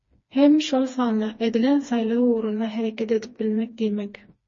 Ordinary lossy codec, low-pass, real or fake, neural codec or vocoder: MP3, 32 kbps; 7.2 kHz; fake; codec, 16 kHz, 2 kbps, FreqCodec, smaller model